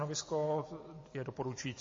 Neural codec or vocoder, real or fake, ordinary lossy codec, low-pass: none; real; MP3, 32 kbps; 7.2 kHz